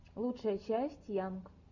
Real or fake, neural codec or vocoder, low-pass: real; none; 7.2 kHz